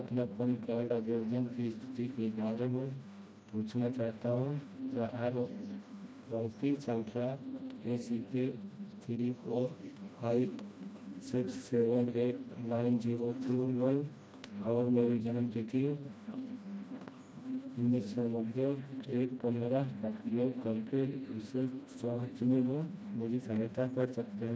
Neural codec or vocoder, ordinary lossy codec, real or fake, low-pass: codec, 16 kHz, 1 kbps, FreqCodec, smaller model; none; fake; none